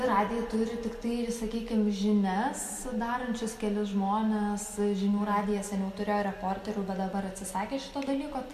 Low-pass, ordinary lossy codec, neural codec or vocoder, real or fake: 14.4 kHz; MP3, 64 kbps; none; real